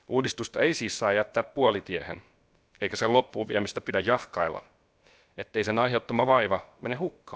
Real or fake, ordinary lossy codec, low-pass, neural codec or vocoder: fake; none; none; codec, 16 kHz, about 1 kbps, DyCAST, with the encoder's durations